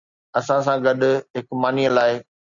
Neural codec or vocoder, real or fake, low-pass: none; real; 7.2 kHz